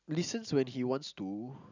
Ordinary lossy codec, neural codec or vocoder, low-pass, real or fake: none; none; 7.2 kHz; real